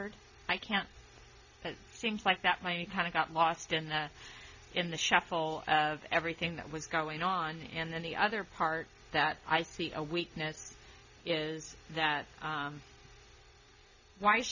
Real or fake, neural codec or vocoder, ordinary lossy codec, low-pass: real; none; MP3, 64 kbps; 7.2 kHz